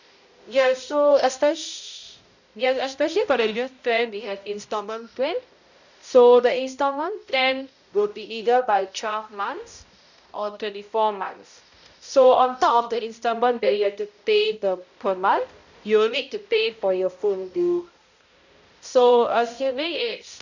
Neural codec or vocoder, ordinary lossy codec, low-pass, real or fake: codec, 16 kHz, 0.5 kbps, X-Codec, HuBERT features, trained on balanced general audio; none; 7.2 kHz; fake